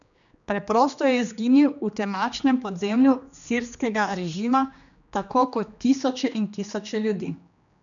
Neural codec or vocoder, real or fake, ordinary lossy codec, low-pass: codec, 16 kHz, 2 kbps, X-Codec, HuBERT features, trained on general audio; fake; none; 7.2 kHz